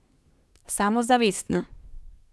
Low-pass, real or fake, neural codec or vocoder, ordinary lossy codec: none; fake; codec, 24 kHz, 1 kbps, SNAC; none